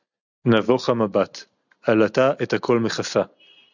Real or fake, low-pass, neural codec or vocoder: real; 7.2 kHz; none